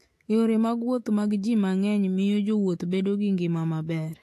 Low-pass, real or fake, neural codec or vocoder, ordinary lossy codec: 14.4 kHz; fake; autoencoder, 48 kHz, 128 numbers a frame, DAC-VAE, trained on Japanese speech; AAC, 64 kbps